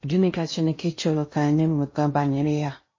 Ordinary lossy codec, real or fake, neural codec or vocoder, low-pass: MP3, 32 kbps; fake; codec, 16 kHz in and 24 kHz out, 0.6 kbps, FocalCodec, streaming, 2048 codes; 7.2 kHz